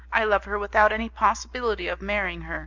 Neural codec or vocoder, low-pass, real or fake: none; 7.2 kHz; real